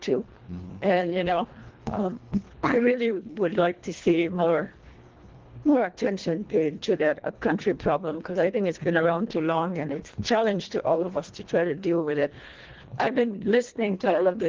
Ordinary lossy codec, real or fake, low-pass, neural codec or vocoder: Opus, 16 kbps; fake; 7.2 kHz; codec, 24 kHz, 1.5 kbps, HILCodec